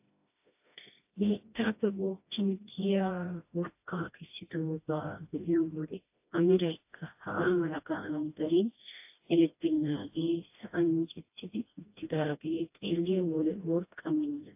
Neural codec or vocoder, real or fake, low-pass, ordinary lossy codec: codec, 16 kHz, 1 kbps, FreqCodec, smaller model; fake; 3.6 kHz; AAC, 32 kbps